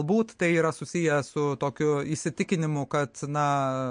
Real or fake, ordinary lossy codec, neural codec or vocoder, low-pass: real; MP3, 48 kbps; none; 9.9 kHz